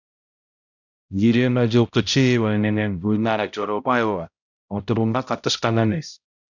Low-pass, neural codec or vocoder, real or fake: 7.2 kHz; codec, 16 kHz, 0.5 kbps, X-Codec, HuBERT features, trained on balanced general audio; fake